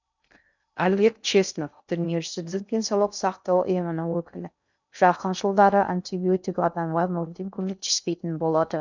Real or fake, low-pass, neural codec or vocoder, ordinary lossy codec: fake; 7.2 kHz; codec, 16 kHz in and 24 kHz out, 0.6 kbps, FocalCodec, streaming, 2048 codes; none